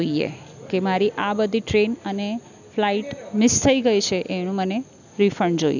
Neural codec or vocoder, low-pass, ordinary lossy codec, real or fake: none; 7.2 kHz; none; real